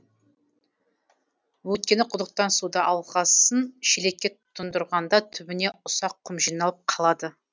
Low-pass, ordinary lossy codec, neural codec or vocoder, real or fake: none; none; none; real